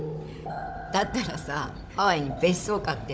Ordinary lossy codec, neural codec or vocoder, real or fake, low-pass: none; codec, 16 kHz, 16 kbps, FunCodec, trained on Chinese and English, 50 frames a second; fake; none